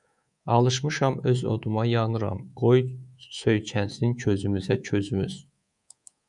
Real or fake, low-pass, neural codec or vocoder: fake; 10.8 kHz; codec, 24 kHz, 3.1 kbps, DualCodec